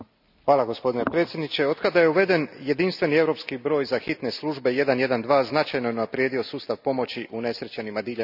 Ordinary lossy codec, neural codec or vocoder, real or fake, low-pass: none; none; real; 5.4 kHz